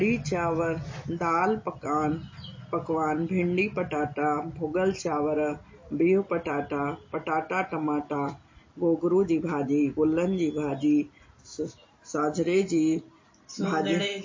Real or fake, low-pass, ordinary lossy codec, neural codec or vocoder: real; 7.2 kHz; MP3, 32 kbps; none